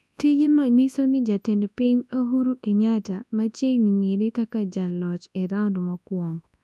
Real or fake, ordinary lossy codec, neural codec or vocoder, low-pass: fake; none; codec, 24 kHz, 0.9 kbps, WavTokenizer, large speech release; none